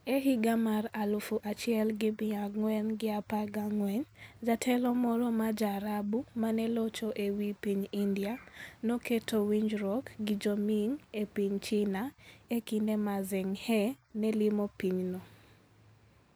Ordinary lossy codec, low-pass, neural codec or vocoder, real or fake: none; none; none; real